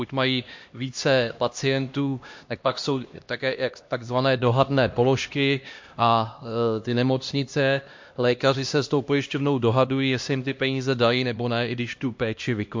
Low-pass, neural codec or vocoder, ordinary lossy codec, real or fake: 7.2 kHz; codec, 16 kHz, 1 kbps, X-Codec, HuBERT features, trained on LibriSpeech; MP3, 48 kbps; fake